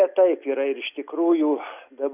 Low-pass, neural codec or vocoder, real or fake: 3.6 kHz; none; real